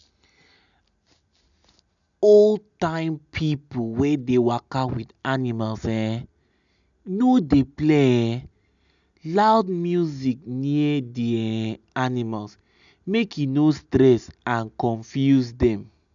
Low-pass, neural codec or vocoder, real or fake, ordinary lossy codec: 7.2 kHz; none; real; none